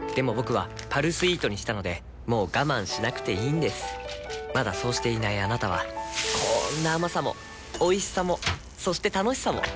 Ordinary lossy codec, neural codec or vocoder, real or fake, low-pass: none; none; real; none